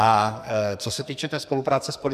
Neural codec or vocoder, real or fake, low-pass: codec, 44.1 kHz, 2.6 kbps, SNAC; fake; 14.4 kHz